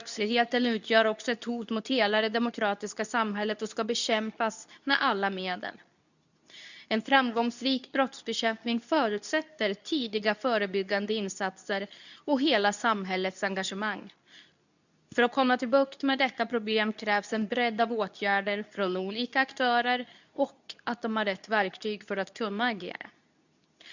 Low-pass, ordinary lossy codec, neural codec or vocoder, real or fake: 7.2 kHz; none; codec, 24 kHz, 0.9 kbps, WavTokenizer, medium speech release version 2; fake